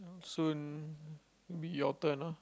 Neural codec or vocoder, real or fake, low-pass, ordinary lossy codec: none; real; none; none